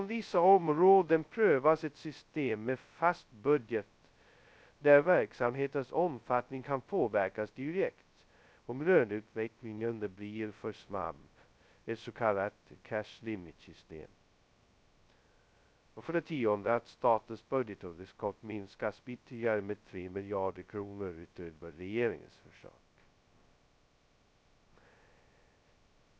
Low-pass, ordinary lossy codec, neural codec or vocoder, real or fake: none; none; codec, 16 kHz, 0.2 kbps, FocalCodec; fake